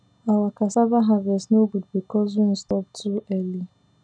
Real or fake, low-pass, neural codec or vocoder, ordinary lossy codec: real; 9.9 kHz; none; none